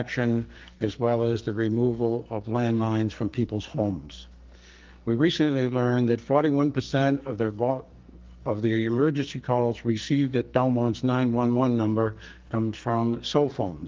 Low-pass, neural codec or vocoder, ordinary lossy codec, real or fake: 7.2 kHz; codec, 44.1 kHz, 2.6 kbps, SNAC; Opus, 32 kbps; fake